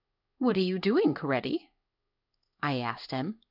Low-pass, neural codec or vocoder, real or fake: 5.4 kHz; codec, 16 kHz in and 24 kHz out, 1 kbps, XY-Tokenizer; fake